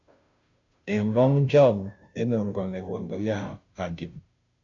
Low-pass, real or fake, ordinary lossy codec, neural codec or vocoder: 7.2 kHz; fake; MP3, 64 kbps; codec, 16 kHz, 0.5 kbps, FunCodec, trained on Chinese and English, 25 frames a second